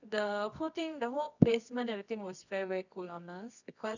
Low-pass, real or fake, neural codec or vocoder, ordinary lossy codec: 7.2 kHz; fake; codec, 24 kHz, 0.9 kbps, WavTokenizer, medium music audio release; none